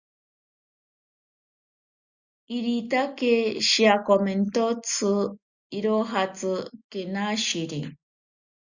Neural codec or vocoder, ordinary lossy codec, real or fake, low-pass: none; Opus, 64 kbps; real; 7.2 kHz